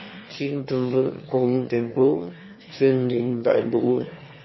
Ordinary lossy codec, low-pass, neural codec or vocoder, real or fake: MP3, 24 kbps; 7.2 kHz; autoencoder, 22.05 kHz, a latent of 192 numbers a frame, VITS, trained on one speaker; fake